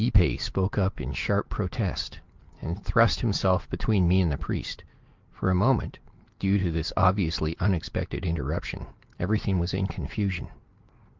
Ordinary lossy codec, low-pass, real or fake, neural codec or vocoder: Opus, 32 kbps; 7.2 kHz; real; none